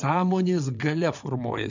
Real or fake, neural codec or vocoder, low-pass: fake; vocoder, 22.05 kHz, 80 mel bands, WaveNeXt; 7.2 kHz